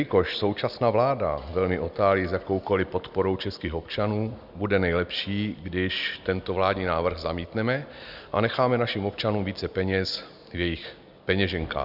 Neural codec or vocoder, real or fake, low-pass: none; real; 5.4 kHz